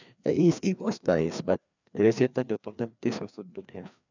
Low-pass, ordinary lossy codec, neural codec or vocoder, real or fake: 7.2 kHz; none; codec, 16 kHz, 2 kbps, FreqCodec, larger model; fake